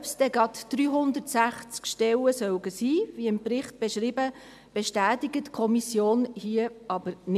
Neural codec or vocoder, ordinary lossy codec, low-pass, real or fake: none; MP3, 96 kbps; 14.4 kHz; real